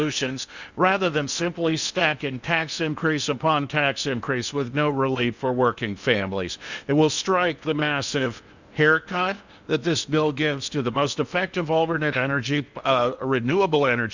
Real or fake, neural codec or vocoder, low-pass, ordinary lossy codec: fake; codec, 16 kHz in and 24 kHz out, 0.8 kbps, FocalCodec, streaming, 65536 codes; 7.2 kHz; Opus, 64 kbps